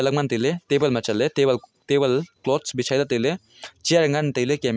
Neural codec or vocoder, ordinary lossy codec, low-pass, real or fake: none; none; none; real